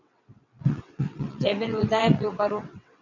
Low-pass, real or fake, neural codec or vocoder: 7.2 kHz; fake; vocoder, 22.05 kHz, 80 mel bands, WaveNeXt